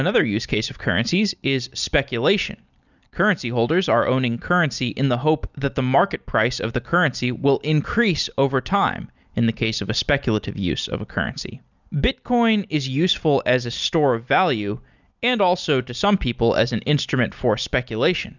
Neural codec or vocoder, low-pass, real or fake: none; 7.2 kHz; real